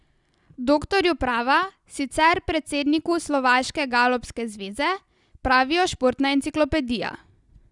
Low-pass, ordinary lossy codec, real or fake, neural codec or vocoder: 10.8 kHz; Opus, 64 kbps; real; none